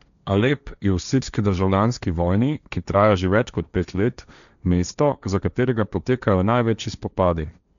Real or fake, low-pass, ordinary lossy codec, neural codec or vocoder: fake; 7.2 kHz; none; codec, 16 kHz, 1.1 kbps, Voila-Tokenizer